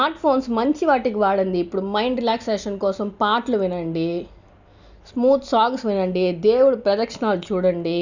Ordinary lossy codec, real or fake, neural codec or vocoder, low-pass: none; real; none; 7.2 kHz